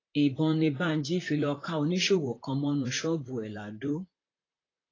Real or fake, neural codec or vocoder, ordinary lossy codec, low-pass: fake; vocoder, 44.1 kHz, 128 mel bands, Pupu-Vocoder; AAC, 32 kbps; 7.2 kHz